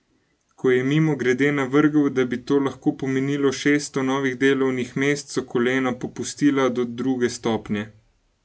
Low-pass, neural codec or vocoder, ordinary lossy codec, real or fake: none; none; none; real